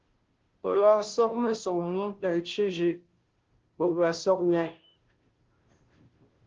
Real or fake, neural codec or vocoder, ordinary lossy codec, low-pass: fake; codec, 16 kHz, 0.5 kbps, FunCodec, trained on Chinese and English, 25 frames a second; Opus, 16 kbps; 7.2 kHz